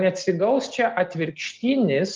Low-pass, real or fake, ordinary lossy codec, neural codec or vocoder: 7.2 kHz; real; Opus, 24 kbps; none